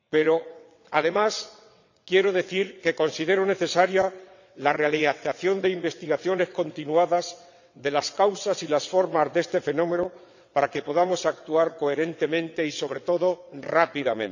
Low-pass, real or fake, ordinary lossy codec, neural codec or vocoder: 7.2 kHz; fake; none; vocoder, 22.05 kHz, 80 mel bands, WaveNeXt